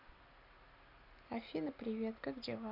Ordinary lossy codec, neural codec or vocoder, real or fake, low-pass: none; none; real; 5.4 kHz